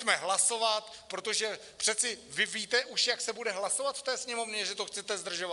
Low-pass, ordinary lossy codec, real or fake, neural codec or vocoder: 10.8 kHz; Opus, 64 kbps; real; none